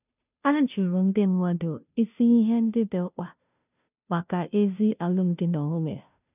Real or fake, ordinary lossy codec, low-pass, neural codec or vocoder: fake; none; 3.6 kHz; codec, 16 kHz, 0.5 kbps, FunCodec, trained on Chinese and English, 25 frames a second